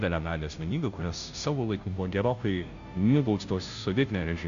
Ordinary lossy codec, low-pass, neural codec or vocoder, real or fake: MP3, 96 kbps; 7.2 kHz; codec, 16 kHz, 0.5 kbps, FunCodec, trained on Chinese and English, 25 frames a second; fake